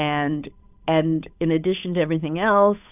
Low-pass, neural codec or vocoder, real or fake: 3.6 kHz; codec, 16 kHz, 8 kbps, FunCodec, trained on Chinese and English, 25 frames a second; fake